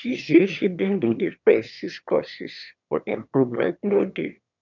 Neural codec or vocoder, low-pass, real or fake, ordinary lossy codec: autoencoder, 22.05 kHz, a latent of 192 numbers a frame, VITS, trained on one speaker; 7.2 kHz; fake; none